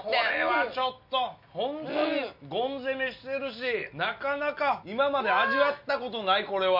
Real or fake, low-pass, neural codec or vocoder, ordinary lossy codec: real; 5.4 kHz; none; none